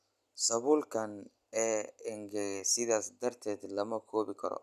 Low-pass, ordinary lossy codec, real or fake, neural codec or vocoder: 14.4 kHz; none; real; none